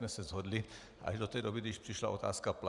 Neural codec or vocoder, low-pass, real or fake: vocoder, 44.1 kHz, 128 mel bands every 512 samples, BigVGAN v2; 10.8 kHz; fake